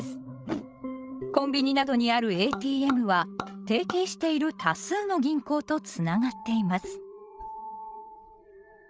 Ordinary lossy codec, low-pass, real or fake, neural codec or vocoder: none; none; fake; codec, 16 kHz, 8 kbps, FreqCodec, larger model